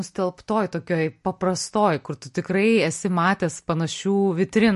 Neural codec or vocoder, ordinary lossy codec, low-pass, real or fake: none; MP3, 48 kbps; 14.4 kHz; real